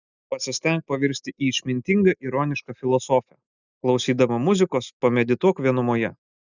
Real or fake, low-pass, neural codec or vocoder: real; 7.2 kHz; none